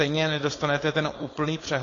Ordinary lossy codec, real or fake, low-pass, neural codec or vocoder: AAC, 32 kbps; fake; 7.2 kHz; codec, 16 kHz, 4.8 kbps, FACodec